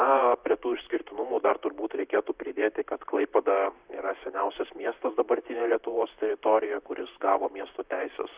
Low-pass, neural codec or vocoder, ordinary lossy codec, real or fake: 3.6 kHz; vocoder, 44.1 kHz, 128 mel bands, Pupu-Vocoder; Opus, 64 kbps; fake